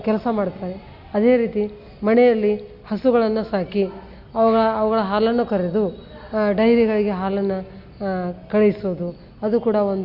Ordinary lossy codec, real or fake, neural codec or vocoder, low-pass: Opus, 64 kbps; real; none; 5.4 kHz